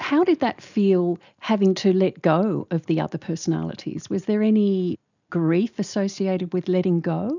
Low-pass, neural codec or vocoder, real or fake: 7.2 kHz; none; real